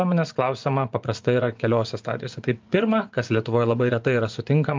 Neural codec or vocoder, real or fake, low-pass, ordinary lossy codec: vocoder, 24 kHz, 100 mel bands, Vocos; fake; 7.2 kHz; Opus, 24 kbps